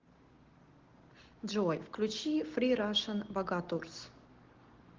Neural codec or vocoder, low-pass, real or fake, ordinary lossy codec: none; 7.2 kHz; real; Opus, 16 kbps